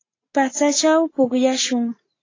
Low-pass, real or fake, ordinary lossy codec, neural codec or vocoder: 7.2 kHz; real; AAC, 32 kbps; none